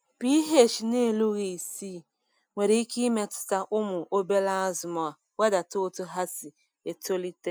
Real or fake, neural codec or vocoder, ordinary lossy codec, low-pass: real; none; none; none